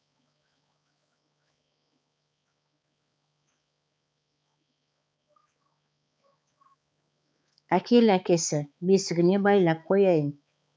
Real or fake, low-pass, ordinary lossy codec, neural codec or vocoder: fake; none; none; codec, 16 kHz, 4 kbps, X-Codec, HuBERT features, trained on balanced general audio